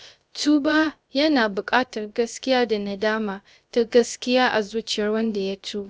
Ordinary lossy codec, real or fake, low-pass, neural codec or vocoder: none; fake; none; codec, 16 kHz, 0.3 kbps, FocalCodec